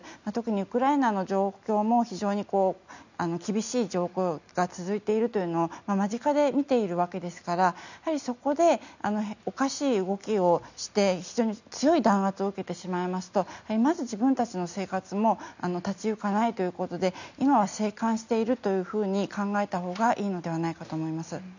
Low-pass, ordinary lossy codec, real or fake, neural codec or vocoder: 7.2 kHz; none; real; none